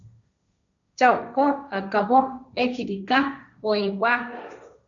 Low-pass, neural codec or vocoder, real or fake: 7.2 kHz; codec, 16 kHz, 1.1 kbps, Voila-Tokenizer; fake